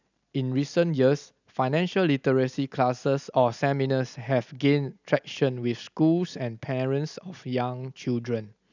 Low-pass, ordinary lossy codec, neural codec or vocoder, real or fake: 7.2 kHz; none; none; real